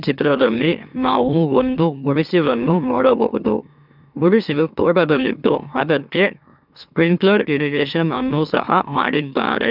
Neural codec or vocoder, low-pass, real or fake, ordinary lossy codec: autoencoder, 44.1 kHz, a latent of 192 numbers a frame, MeloTTS; 5.4 kHz; fake; none